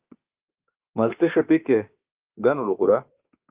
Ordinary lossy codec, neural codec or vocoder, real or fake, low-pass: Opus, 32 kbps; autoencoder, 48 kHz, 32 numbers a frame, DAC-VAE, trained on Japanese speech; fake; 3.6 kHz